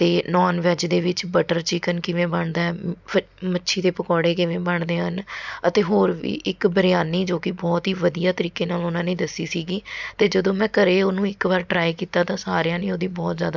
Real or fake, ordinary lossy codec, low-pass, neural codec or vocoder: real; none; 7.2 kHz; none